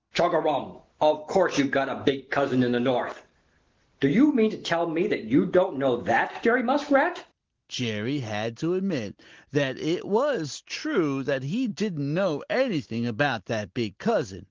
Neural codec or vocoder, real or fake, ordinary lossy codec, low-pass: none; real; Opus, 16 kbps; 7.2 kHz